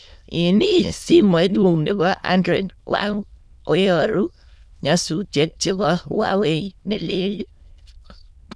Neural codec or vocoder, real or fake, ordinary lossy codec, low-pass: autoencoder, 22.05 kHz, a latent of 192 numbers a frame, VITS, trained on many speakers; fake; none; none